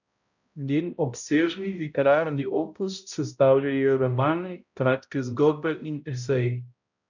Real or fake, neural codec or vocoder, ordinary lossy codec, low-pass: fake; codec, 16 kHz, 0.5 kbps, X-Codec, HuBERT features, trained on balanced general audio; none; 7.2 kHz